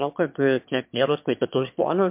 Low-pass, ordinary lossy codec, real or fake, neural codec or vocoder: 3.6 kHz; MP3, 32 kbps; fake; autoencoder, 22.05 kHz, a latent of 192 numbers a frame, VITS, trained on one speaker